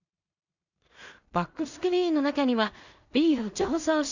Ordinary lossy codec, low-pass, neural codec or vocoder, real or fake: none; 7.2 kHz; codec, 16 kHz in and 24 kHz out, 0.4 kbps, LongCat-Audio-Codec, two codebook decoder; fake